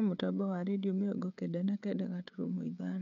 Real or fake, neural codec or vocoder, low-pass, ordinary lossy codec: fake; codec, 16 kHz, 16 kbps, FreqCodec, smaller model; 7.2 kHz; MP3, 96 kbps